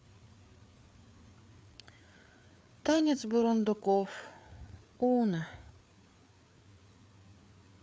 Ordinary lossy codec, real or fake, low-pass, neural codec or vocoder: none; fake; none; codec, 16 kHz, 4 kbps, FreqCodec, larger model